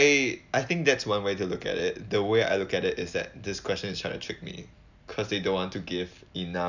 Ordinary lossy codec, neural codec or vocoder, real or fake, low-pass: none; none; real; 7.2 kHz